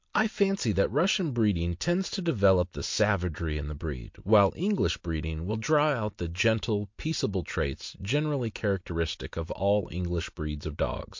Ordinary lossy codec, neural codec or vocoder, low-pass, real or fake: MP3, 48 kbps; none; 7.2 kHz; real